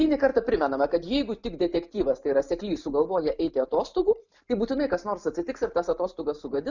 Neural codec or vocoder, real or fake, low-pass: none; real; 7.2 kHz